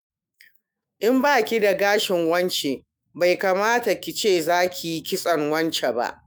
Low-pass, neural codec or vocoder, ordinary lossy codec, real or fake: none; autoencoder, 48 kHz, 128 numbers a frame, DAC-VAE, trained on Japanese speech; none; fake